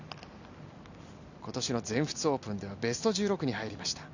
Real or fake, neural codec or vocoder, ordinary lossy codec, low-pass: real; none; none; 7.2 kHz